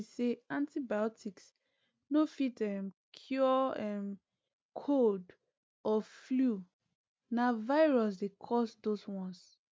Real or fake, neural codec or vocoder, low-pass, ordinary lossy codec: real; none; none; none